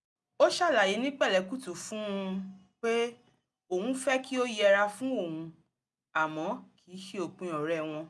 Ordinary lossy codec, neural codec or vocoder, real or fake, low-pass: none; none; real; none